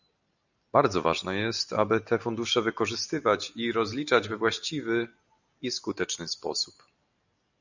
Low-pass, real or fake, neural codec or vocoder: 7.2 kHz; real; none